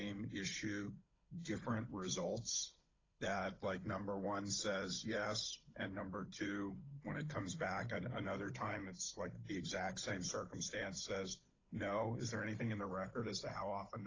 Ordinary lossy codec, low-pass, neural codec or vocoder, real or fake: AAC, 32 kbps; 7.2 kHz; codec, 16 kHz, 16 kbps, FunCodec, trained on Chinese and English, 50 frames a second; fake